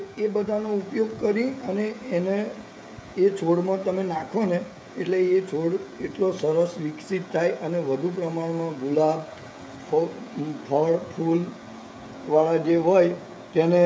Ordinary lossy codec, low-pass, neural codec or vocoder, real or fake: none; none; codec, 16 kHz, 16 kbps, FreqCodec, smaller model; fake